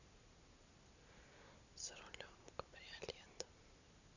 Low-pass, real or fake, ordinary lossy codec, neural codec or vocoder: 7.2 kHz; real; none; none